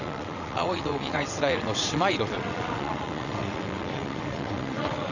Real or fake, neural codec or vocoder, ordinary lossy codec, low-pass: fake; vocoder, 22.05 kHz, 80 mel bands, WaveNeXt; none; 7.2 kHz